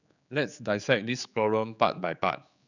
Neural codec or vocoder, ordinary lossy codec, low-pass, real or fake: codec, 16 kHz, 4 kbps, X-Codec, HuBERT features, trained on general audio; none; 7.2 kHz; fake